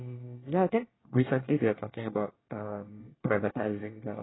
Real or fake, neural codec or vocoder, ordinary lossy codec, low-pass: fake; codec, 24 kHz, 1 kbps, SNAC; AAC, 16 kbps; 7.2 kHz